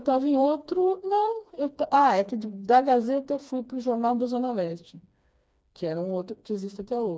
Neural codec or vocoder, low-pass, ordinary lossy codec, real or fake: codec, 16 kHz, 2 kbps, FreqCodec, smaller model; none; none; fake